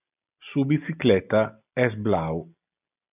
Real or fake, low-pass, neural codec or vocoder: real; 3.6 kHz; none